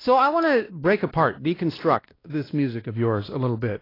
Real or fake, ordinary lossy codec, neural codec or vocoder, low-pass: fake; AAC, 24 kbps; codec, 16 kHz, 1 kbps, X-Codec, WavLM features, trained on Multilingual LibriSpeech; 5.4 kHz